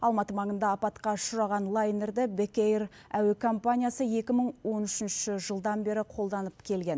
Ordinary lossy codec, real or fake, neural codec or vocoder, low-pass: none; real; none; none